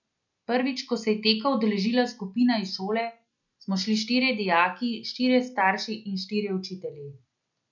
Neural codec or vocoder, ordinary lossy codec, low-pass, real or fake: none; none; 7.2 kHz; real